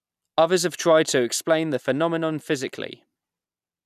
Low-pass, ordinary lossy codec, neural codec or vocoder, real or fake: 14.4 kHz; none; none; real